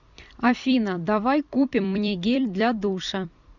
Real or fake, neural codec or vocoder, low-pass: fake; vocoder, 44.1 kHz, 128 mel bands every 256 samples, BigVGAN v2; 7.2 kHz